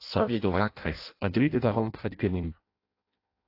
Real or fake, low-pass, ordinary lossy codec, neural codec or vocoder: fake; 5.4 kHz; AAC, 32 kbps; codec, 16 kHz in and 24 kHz out, 0.6 kbps, FireRedTTS-2 codec